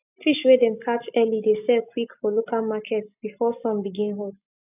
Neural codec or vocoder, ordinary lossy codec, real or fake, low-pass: none; none; real; 3.6 kHz